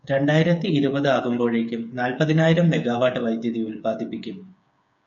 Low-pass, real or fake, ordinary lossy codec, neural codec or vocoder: 7.2 kHz; fake; MP3, 96 kbps; codec, 16 kHz, 8 kbps, FreqCodec, smaller model